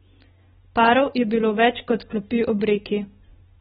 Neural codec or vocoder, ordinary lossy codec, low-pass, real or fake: none; AAC, 16 kbps; 7.2 kHz; real